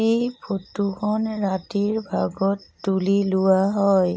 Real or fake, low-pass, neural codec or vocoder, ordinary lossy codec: real; none; none; none